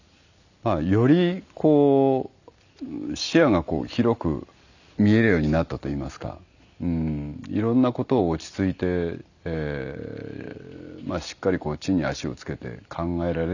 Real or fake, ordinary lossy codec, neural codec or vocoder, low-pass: real; none; none; 7.2 kHz